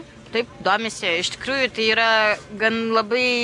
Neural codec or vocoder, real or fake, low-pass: none; real; 10.8 kHz